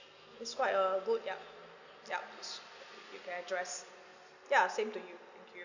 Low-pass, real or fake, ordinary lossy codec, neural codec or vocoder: 7.2 kHz; real; none; none